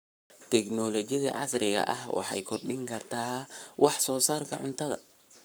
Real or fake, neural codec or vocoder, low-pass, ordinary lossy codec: fake; codec, 44.1 kHz, 7.8 kbps, Pupu-Codec; none; none